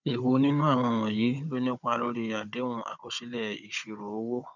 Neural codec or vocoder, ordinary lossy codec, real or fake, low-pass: codec, 16 kHz, 16 kbps, FunCodec, trained on Chinese and English, 50 frames a second; none; fake; 7.2 kHz